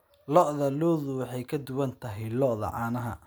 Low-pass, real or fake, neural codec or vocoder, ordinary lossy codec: none; real; none; none